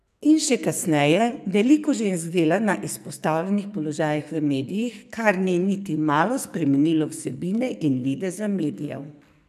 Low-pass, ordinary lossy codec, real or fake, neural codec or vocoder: 14.4 kHz; none; fake; codec, 44.1 kHz, 2.6 kbps, SNAC